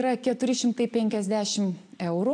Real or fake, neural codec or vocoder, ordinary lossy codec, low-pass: real; none; AAC, 64 kbps; 9.9 kHz